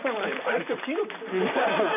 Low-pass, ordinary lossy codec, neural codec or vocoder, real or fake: 3.6 kHz; none; vocoder, 44.1 kHz, 128 mel bands, Pupu-Vocoder; fake